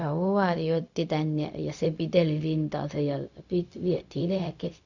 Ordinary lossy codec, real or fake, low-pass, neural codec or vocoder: none; fake; 7.2 kHz; codec, 16 kHz, 0.4 kbps, LongCat-Audio-Codec